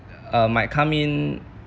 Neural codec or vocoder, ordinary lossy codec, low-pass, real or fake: none; none; none; real